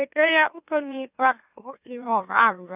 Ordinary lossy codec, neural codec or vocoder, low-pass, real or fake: none; autoencoder, 44.1 kHz, a latent of 192 numbers a frame, MeloTTS; 3.6 kHz; fake